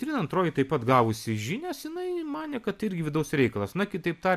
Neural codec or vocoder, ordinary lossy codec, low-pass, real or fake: none; AAC, 96 kbps; 14.4 kHz; real